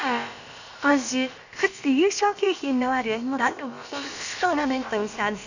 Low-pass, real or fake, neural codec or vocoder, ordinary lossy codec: 7.2 kHz; fake; codec, 16 kHz, about 1 kbps, DyCAST, with the encoder's durations; none